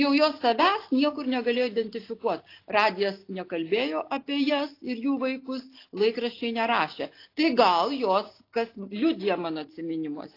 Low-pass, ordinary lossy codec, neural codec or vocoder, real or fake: 5.4 kHz; AAC, 32 kbps; none; real